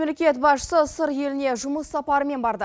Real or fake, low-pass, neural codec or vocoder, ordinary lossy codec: real; none; none; none